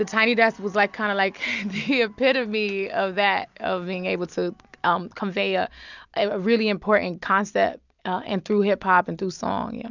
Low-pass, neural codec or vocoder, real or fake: 7.2 kHz; none; real